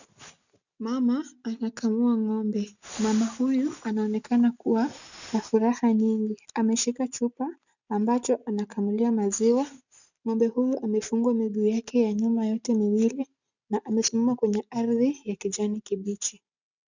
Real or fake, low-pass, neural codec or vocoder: real; 7.2 kHz; none